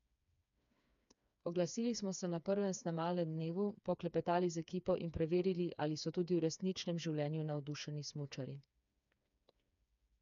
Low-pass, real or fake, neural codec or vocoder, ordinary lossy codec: 7.2 kHz; fake; codec, 16 kHz, 4 kbps, FreqCodec, smaller model; none